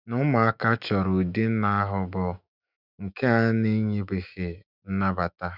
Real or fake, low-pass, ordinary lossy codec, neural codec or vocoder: fake; 5.4 kHz; none; autoencoder, 48 kHz, 128 numbers a frame, DAC-VAE, trained on Japanese speech